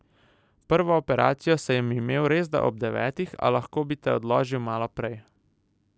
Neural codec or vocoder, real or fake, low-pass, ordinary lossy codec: none; real; none; none